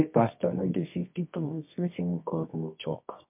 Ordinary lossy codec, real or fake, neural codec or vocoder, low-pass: MP3, 32 kbps; fake; codec, 24 kHz, 0.9 kbps, WavTokenizer, medium music audio release; 3.6 kHz